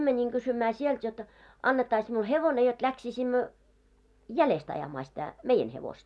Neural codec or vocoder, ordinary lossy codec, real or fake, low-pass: none; none; real; none